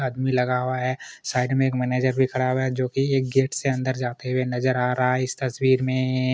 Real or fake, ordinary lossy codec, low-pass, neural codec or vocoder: real; none; none; none